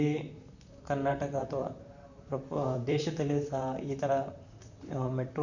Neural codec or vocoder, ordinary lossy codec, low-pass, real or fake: vocoder, 44.1 kHz, 128 mel bands, Pupu-Vocoder; none; 7.2 kHz; fake